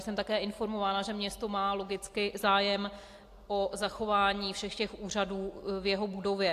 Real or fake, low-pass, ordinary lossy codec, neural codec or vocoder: real; 14.4 kHz; AAC, 64 kbps; none